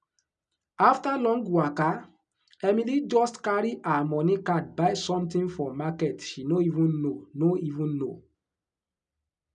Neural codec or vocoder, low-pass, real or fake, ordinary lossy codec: none; 9.9 kHz; real; none